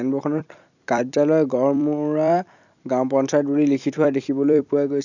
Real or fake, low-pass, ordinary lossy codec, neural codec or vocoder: fake; 7.2 kHz; none; vocoder, 44.1 kHz, 128 mel bands every 256 samples, BigVGAN v2